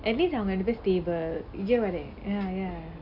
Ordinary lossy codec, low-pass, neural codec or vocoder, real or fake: AAC, 48 kbps; 5.4 kHz; none; real